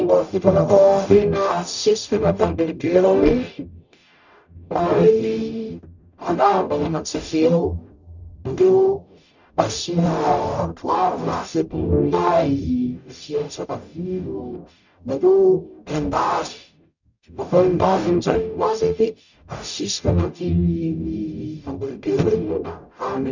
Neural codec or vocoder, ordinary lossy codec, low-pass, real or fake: codec, 44.1 kHz, 0.9 kbps, DAC; none; 7.2 kHz; fake